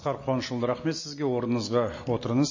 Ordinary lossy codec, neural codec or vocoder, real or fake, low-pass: MP3, 32 kbps; none; real; 7.2 kHz